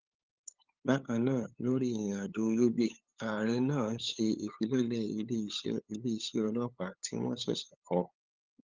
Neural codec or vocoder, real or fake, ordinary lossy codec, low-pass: codec, 16 kHz, 8 kbps, FunCodec, trained on LibriTTS, 25 frames a second; fake; Opus, 24 kbps; 7.2 kHz